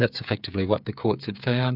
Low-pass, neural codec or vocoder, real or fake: 5.4 kHz; codec, 16 kHz, 8 kbps, FreqCodec, smaller model; fake